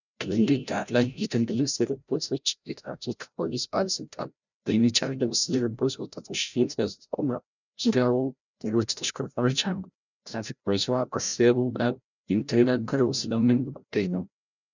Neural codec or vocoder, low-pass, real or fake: codec, 16 kHz, 0.5 kbps, FreqCodec, larger model; 7.2 kHz; fake